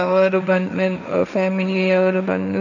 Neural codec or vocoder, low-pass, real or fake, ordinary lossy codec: codec, 16 kHz, 1.1 kbps, Voila-Tokenizer; 7.2 kHz; fake; none